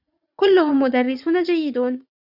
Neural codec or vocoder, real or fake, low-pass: vocoder, 44.1 kHz, 80 mel bands, Vocos; fake; 5.4 kHz